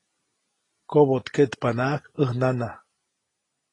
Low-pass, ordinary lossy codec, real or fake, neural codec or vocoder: 10.8 kHz; AAC, 32 kbps; real; none